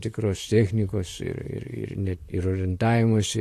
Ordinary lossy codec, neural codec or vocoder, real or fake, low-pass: AAC, 64 kbps; vocoder, 44.1 kHz, 128 mel bands, Pupu-Vocoder; fake; 14.4 kHz